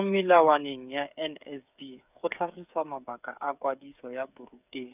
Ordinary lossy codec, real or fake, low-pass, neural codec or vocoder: none; fake; 3.6 kHz; codec, 16 kHz, 8 kbps, FreqCodec, smaller model